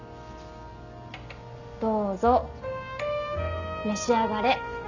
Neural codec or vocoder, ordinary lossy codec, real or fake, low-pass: none; none; real; 7.2 kHz